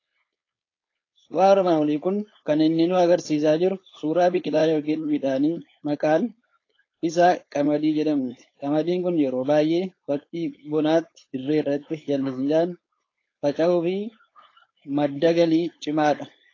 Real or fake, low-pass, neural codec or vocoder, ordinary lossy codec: fake; 7.2 kHz; codec, 16 kHz, 4.8 kbps, FACodec; AAC, 32 kbps